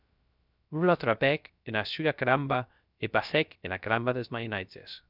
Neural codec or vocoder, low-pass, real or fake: codec, 16 kHz, 0.3 kbps, FocalCodec; 5.4 kHz; fake